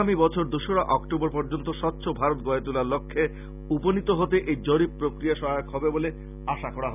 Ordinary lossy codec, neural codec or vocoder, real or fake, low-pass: none; none; real; 3.6 kHz